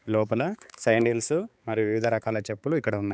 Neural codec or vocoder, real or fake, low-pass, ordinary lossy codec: codec, 16 kHz, 4 kbps, X-Codec, HuBERT features, trained on balanced general audio; fake; none; none